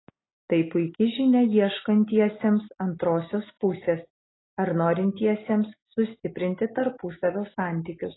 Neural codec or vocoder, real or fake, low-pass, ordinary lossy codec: none; real; 7.2 kHz; AAC, 16 kbps